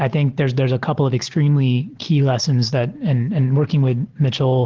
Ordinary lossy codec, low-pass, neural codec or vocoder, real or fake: Opus, 16 kbps; 7.2 kHz; none; real